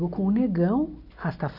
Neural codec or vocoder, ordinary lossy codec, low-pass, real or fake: none; none; 5.4 kHz; real